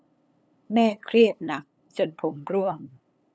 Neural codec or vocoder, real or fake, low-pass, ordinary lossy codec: codec, 16 kHz, 8 kbps, FunCodec, trained on LibriTTS, 25 frames a second; fake; none; none